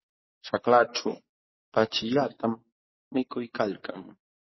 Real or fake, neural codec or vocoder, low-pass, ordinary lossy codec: fake; codec, 44.1 kHz, 7.8 kbps, DAC; 7.2 kHz; MP3, 24 kbps